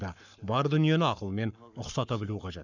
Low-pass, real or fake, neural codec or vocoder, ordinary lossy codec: 7.2 kHz; fake; codec, 44.1 kHz, 7.8 kbps, Pupu-Codec; none